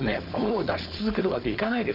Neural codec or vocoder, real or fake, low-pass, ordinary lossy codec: codec, 16 kHz, 4.8 kbps, FACodec; fake; 5.4 kHz; none